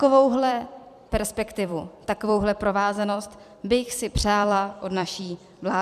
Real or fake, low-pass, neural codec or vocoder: fake; 14.4 kHz; vocoder, 44.1 kHz, 128 mel bands every 256 samples, BigVGAN v2